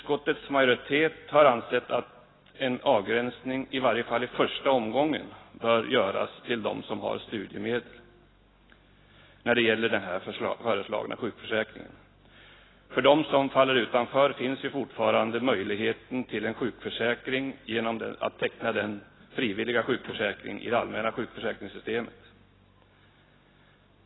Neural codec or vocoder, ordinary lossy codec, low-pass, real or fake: none; AAC, 16 kbps; 7.2 kHz; real